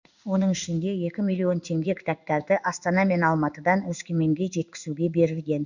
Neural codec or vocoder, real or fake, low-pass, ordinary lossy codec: codec, 16 kHz in and 24 kHz out, 1 kbps, XY-Tokenizer; fake; 7.2 kHz; none